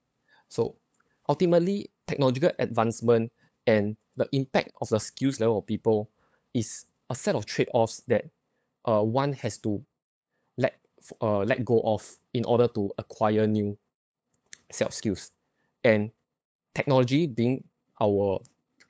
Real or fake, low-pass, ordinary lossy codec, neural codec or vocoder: fake; none; none; codec, 16 kHz, 8 kbps, FunCodec, trained on LibriTTS, 25 frames a second